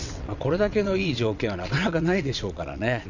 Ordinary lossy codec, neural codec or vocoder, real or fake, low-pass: none; vocoder, 22.05 kHz, 80 mel bands, WaveNeXt; fake; 7.2 kHz